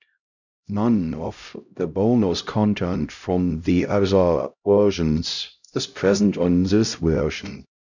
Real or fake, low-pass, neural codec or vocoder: fake; 7.2 kHz; codec, 16 kHz, 0.5 kbps, X-Codec, HuBERT features, trained on LibriSpeech